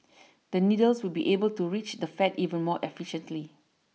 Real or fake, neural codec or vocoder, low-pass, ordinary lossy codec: real; none; none; none